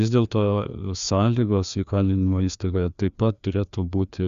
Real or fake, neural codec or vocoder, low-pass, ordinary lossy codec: fake; codec, 16 kHz, 2 kbps, FreqCodec, larger model; 7.2 kHz; Opus, 64 kbps